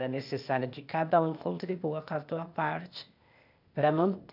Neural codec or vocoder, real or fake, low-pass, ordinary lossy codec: codec, 16 kHz, 0.8 kbps, ZipCodec; fake; 5.4 kHz; none